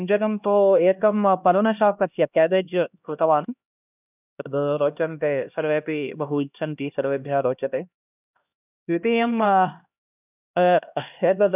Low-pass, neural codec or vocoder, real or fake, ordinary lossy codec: 3.6 kHz; codec, 16 kHz, 1 kbps, X-Codec, HuBERT features, trained on LibriSpeech; fake; none